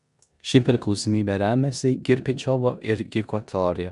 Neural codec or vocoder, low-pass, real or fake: codec, 16 kHz in and 24 kHz out, 0.9 kbps, LongCat-Audio-Codec, four codebook decoder; 10.8 kHz; fake